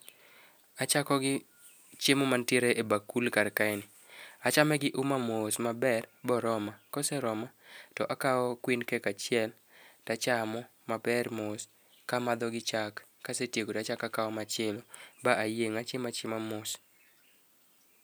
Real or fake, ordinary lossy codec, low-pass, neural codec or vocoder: real; none; none; none